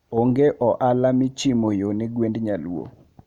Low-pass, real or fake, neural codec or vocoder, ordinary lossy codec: 19.8 kHz; real; none; none